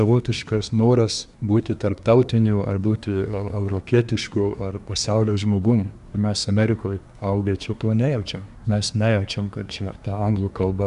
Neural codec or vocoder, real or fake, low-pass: codec, 24 kHz, 1 kbps, SNAC; fake; 10.8 kHz